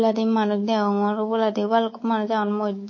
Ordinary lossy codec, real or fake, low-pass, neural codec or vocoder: MP3, 32 kbps; fake; 7.2 kHz; codec, 24 kHz, 3.1 kbps, DualCodec